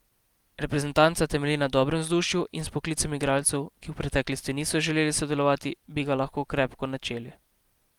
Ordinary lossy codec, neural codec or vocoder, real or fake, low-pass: Opus, 32 kbps; none; real; 19.8 kHz